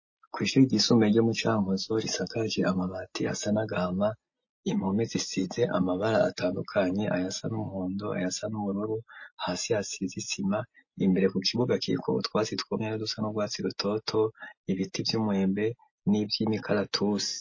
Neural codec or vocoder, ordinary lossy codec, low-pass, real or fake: none; MP3, 32 kbps; 7.2 kHz; real